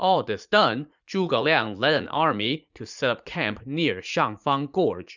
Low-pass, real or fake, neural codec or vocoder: 7.2 kHz; fake; vocoder, 44.1 kHz, 80 mel bands, Vocos